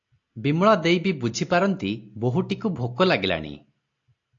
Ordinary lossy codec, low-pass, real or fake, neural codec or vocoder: AAC, 48 kbps; 7.2 kHz; real; none